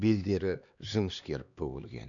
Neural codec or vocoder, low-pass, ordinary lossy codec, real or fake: codec, 16 kHz, 2 kbps, X-Codec, HuBERT features, trained on LibriSpeech; 7.2 kHz; none; fake